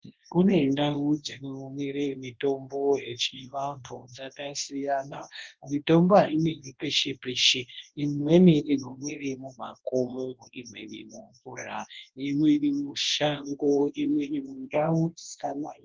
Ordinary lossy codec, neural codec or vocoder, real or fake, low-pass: Opus, 16 kbps; codec, 24 kHz, 0.9 kbps, WavTokenizer, large speech release; fake; 7.2 kHz